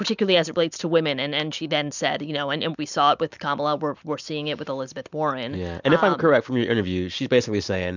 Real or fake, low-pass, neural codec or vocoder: real; 7.2 kHz; none